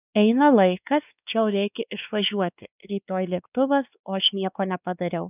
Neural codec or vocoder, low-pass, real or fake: codec, 16 kHz, 2 kbps, X-Codec, WavLM features, trained on Multilingual LibriSpeech; 3.6 kHz; fake